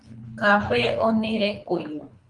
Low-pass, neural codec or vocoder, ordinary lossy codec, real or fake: 10.8 kHz; codec, 24 kHz, 3 kbps, HILCodec; Opus, 32 kbps; fake